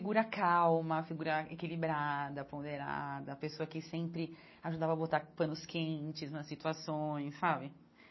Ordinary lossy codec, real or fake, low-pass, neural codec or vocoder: MP3, 24 kbps; real; 7.2 kHz; none